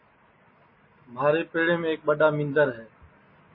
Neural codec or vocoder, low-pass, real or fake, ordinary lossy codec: none; 5.4 kHz; real; MP3, 24 kbps